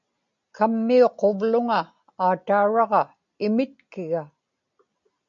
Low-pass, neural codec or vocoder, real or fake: 7.2 kHz; none; real